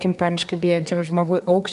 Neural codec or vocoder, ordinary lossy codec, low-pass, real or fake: codec, 24 kHz, 1 kbps, SNAC; MP3, 96 kbps; 10.8 kHz; fake